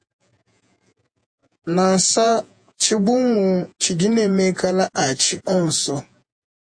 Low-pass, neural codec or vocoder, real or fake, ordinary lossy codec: 9.9 kHz; vocoder, 48 kHz, 128 mel bands, Vocos; fake; AAC, 64 kbps